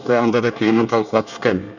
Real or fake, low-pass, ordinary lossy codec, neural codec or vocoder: fake; 7.2 kHz; none; codec, 24 kHz, 1 kbps, SNAC